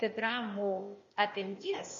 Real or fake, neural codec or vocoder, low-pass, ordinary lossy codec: fake; codec, 16 kHz, 0.8 kbps, ZipCodec; 7.2 kHz; MP3, 32 kbps